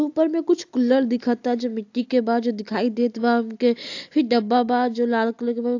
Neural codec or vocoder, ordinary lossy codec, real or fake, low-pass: none; AAC, 48 kbps; real; 7.2 kHz